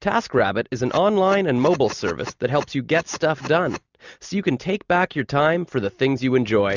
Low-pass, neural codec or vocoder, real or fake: 7.2 kHz; none; real